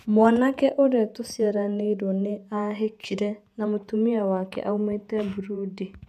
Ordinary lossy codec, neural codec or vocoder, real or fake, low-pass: none; vocoder, 48 kHz, 128 mel bands, Vocos; fake; 14.4 kHz